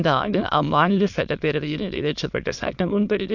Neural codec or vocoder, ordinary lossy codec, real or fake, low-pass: autoencoder, 22.05 kHz, a latent of 192 numbers a frame, VITS, trained on many speakers; none; fake; 7.2 kHz